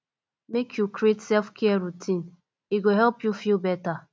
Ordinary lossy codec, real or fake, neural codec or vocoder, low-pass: none; real; none; none